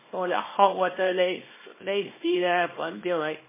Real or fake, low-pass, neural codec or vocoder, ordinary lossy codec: fake; 3.6 kHz; codec, 24 kHz, 0.9 kbps, WavTokenizer, small release; MP3, 16 kbps